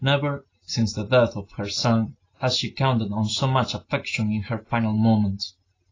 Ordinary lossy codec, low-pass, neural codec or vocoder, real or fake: AAC, 32 kbps; 7.2 kHz; none; real